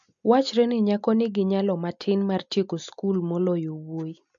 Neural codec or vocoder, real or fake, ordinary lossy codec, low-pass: none; real; MP3, 64 kbps; 7.2 kHz